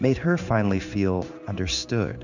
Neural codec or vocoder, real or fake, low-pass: codec, 16 kHz in and 24 kHz out, 1 kbps, XY-Tokenizer; fake; 7.2 kHz